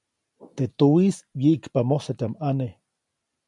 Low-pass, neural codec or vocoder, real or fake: 10.8 kHz; none; real